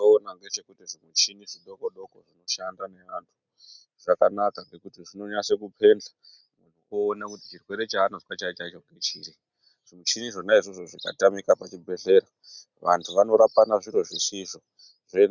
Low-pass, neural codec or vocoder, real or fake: 7.2 kHz; none; real